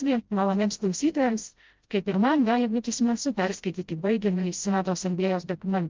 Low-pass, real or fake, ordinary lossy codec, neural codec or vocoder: 7.2 kHz; fake; Opus, 24 kbps; codec, 16 kHz, 0.5 kbps, FreqCodec, smaller model